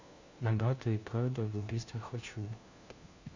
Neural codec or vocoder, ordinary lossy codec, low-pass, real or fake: codec, 16 kHz, 1 kbps, FunCodec, trained on LibriTTS, 50 frames a second; Opus, 64 kbps; 7.2 kHz; fake